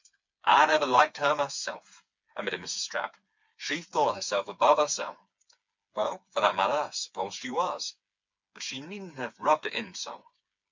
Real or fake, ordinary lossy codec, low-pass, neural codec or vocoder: fake; MP3, 64 kbps; 7.2 kHz; codec, 16 kHz, 4 kbps, FreqCodec, smaller model